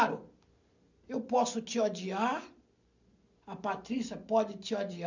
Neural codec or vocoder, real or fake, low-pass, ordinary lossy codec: none; real; 7.2 kHz; none